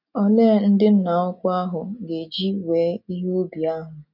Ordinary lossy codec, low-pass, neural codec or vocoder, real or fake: none; 5.4 kHz; none; real